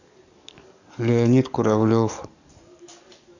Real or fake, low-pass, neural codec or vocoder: fake; 7.2 kHz; codec, 44.1 kHz, 7.8 kbps, DAC